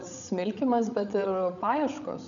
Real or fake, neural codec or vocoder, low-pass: fake; codec, 16 kHz, 8 kbps, FreqCodec, larger model; 7.2 kHz